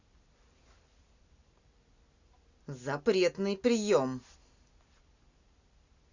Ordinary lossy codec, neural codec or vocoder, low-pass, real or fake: Opus, 64 kbps; none; 7.2 kHz; real